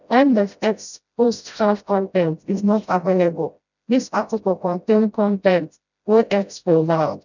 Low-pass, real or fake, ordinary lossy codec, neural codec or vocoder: 7.2 kHz; fake; none; codec, 16 kHz, 0.5 kbps, FreqCodec, smaller model